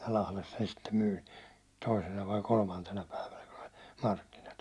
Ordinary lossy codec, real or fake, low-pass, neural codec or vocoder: none; real; none; none